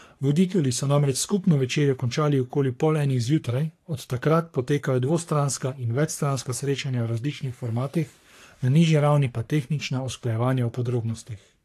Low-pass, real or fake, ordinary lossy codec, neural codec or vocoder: 14.4 kHz; fake; AAC, 64 kbps; codec, 44.1 kHz, 3.4 kbps, Pupu-Codec